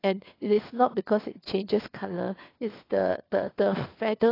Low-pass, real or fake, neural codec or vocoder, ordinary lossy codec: 5.4 kHz; fake; codec, 16 kHz, 4 kbps, FunCodec, trained on LibriTTS, 50 frames a second; AAC, 24 kbps